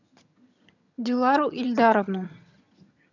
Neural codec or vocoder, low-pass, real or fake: vocoder, 22.05 kHz, 80 mel bands, HiFi-GAN; 7.2 kHz; fake